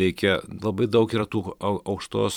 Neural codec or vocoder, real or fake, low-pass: vocoder, 44.1 kHz, 128 mel bands every 512 samples, BigVGAN v2; fake; 19.8 kHz